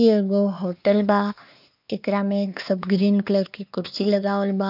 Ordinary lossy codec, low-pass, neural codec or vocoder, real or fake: none; 5.4 kHz; codec, 16 kHz, 2 kbps, X-Codec, WavLM features, trained on Multilingual LibriSpeech; fake